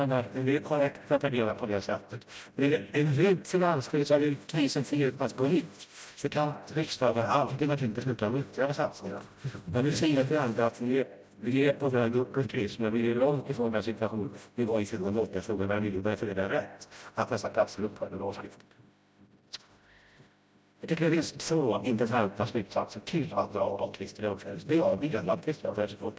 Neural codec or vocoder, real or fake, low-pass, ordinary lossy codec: codec, 16 kHz, 0.5 kbps, FreqCodec, smaller model; fake; none; none